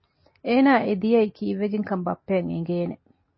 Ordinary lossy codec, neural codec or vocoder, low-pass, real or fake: MP3, 24 kbps; none; 7.2 kHz; real